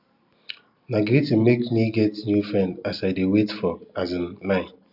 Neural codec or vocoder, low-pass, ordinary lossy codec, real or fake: none; 5.4 kHz; none; real